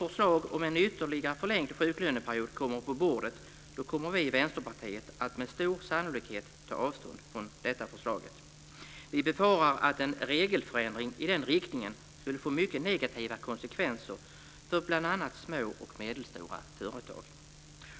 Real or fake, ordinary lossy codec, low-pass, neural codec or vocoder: real; none; none; none